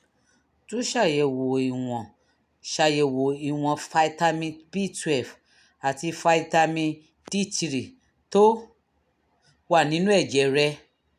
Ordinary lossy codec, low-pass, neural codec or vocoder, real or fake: none; 14.4 kHz; none; real